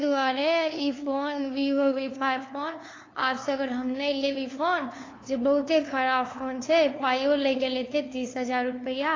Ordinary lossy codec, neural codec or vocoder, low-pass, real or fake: AAC, 32 kbps; codec, 16 kHz, 2 kbps, FunCodec, trained on LibriTTS, 25 frames a second; 7.2 kHz; fake